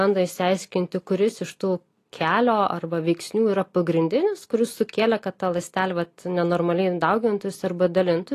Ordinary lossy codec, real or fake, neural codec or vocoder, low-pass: AAC, 48 kbps; real; none; 14.4 kHz